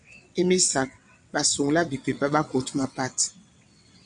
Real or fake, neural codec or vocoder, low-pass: fake; vocoder, 22.05 kHz, 80 mel bands, WaveNeXt; 9.9 kHz